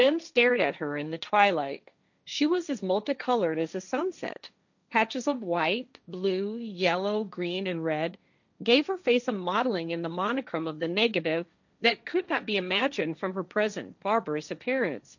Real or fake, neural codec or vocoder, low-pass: fake; codec, 16 kHz, 1.1 kbps, Voila-Tokenizer; 7.2 kHz